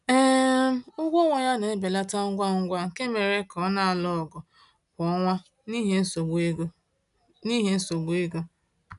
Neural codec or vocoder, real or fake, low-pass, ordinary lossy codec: none; real; 10.8 kHz; none